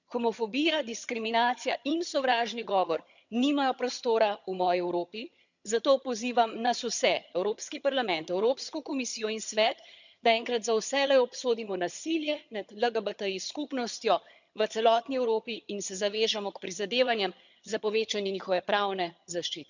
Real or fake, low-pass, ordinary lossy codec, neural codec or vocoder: fake; 7.2 kHz; none; vocoder, 22.05 kHz, 80 mel bands, HiFi-GAN